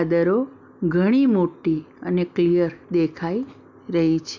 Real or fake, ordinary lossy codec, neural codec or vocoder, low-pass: real; none; none; 7.2 kHz